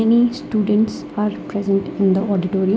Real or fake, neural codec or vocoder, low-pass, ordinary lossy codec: real; none; none; none